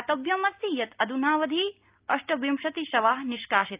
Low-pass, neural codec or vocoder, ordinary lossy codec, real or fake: 3.6 kHz; none; Opus, 24 kbps; real